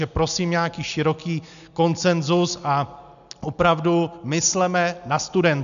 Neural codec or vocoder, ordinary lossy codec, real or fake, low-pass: none; MP3, 96 kbps; real; 7.2 kHz